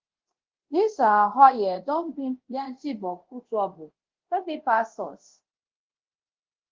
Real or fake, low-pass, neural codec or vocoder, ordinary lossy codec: fake; 7.2 kHz; codec, 24 kHz, 0.5 kbps, DualCodec; Opus, 16 kbps